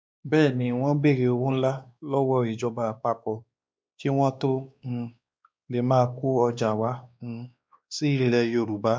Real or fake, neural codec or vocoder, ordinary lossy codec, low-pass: fake; codec, 16 kHz, 2 kbps, X-Codec, WavLM features, trained on Multilingual LibriSpeech; none; none